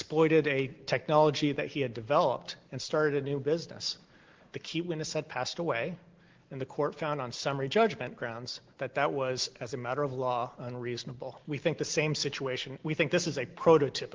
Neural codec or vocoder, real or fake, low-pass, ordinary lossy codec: none; real; 7.2 kHz; Opus, 16 kbps